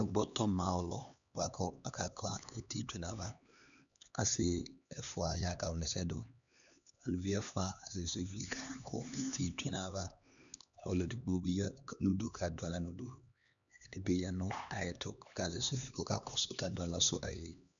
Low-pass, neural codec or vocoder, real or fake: 7.2 kHz; codec, 16 kHz, 2 kbps, X-Codec, HuBERT features, trained on LibriSpeech; fake